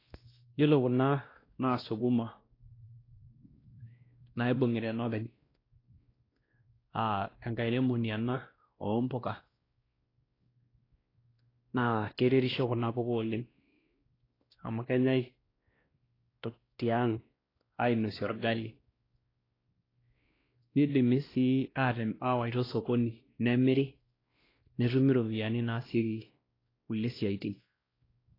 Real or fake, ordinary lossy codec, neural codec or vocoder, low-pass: fake; AAC, 24 kbps; codec, 16 kHz, 1 kbps, X-Codec, WavLM features, trained on Multilingual LibriSpeech; 5.4 kHz